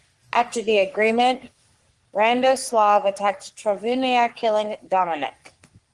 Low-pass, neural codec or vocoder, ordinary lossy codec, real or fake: 10.8 kHz; codec, 44.1 kHz, 3.4 kbps, Pupu-Codec; Opus, 24 kbps; fake